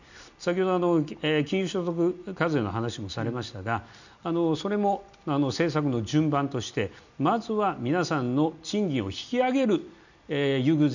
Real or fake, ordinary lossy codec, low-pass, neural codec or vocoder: real; none; 7.2 kHz; none